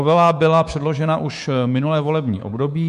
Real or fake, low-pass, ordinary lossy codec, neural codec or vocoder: fake; 10.8 kHz; MP3, 64 kbps; codec, 24 kHz, 3.1 kbps, DualCodec